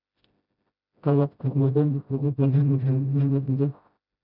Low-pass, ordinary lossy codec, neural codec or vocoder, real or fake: 5.4 kHz; none; codec, 16 kHz, 0.5 kbps, FreqCodec, smaller model; fake